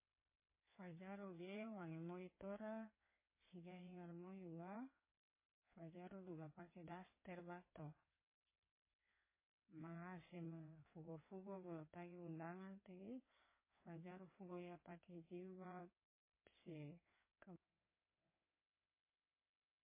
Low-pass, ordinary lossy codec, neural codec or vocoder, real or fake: 3.6 kHz; MP3, 16 kbps; codec, 16 kHz in and 24 kHz out, 2.2 kbps, FireRedTTS-2 codec; fake